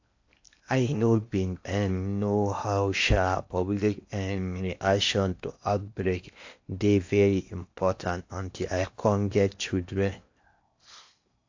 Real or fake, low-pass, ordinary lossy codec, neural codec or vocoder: fake; 7.2 kHz; AAC, 48 kbps; codec, 16 kHz in and 24 kHz out, 0.8 kbps, FocalCodec, streaming, 65536 codes